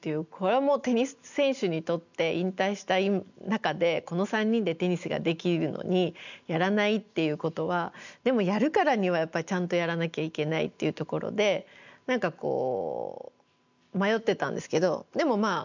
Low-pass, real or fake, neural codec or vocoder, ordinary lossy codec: 7.2 kHz; real; none; none